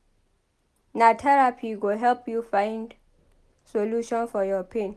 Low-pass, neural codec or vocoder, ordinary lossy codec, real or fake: none; none; none; real